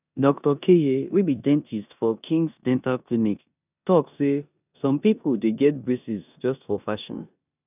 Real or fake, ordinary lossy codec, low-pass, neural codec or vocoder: fake; none; 3.6 kHz; codec, 16 kHz in and 24 kHz out, 0.9 kbps, LongCat-Audio-Codec, four codebook decoder